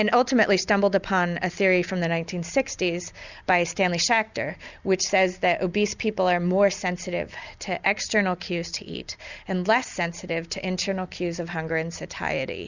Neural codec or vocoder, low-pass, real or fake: none; 7.2 kHz; real